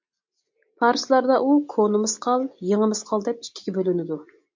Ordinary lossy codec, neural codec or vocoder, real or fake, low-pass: MP3, 48 kbps; none; real; 7.2 kHz